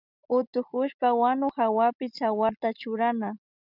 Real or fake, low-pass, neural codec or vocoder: real; 5.4 kHz; none